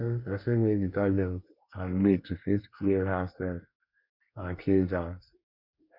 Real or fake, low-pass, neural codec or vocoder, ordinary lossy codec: fake; 5.4 kHz; codec, 24 kHz, 1 kbps, SNAC; none